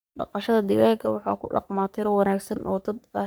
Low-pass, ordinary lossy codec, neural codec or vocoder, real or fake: none; none; codec, 44.1 kHz, 3.4 kbps, Pupu-Codec; fake